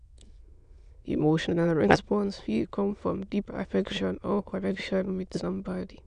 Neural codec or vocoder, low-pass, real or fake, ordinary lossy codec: autoencoder, 22.05 kHz, a latent of 192 numbers a frame, VITS, trained on many speakers; 9.9 kHz; fake; none